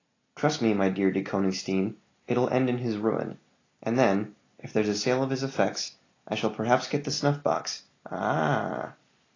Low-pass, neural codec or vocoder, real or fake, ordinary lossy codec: 7.2 kHz; none; real; AAC, 32 kbps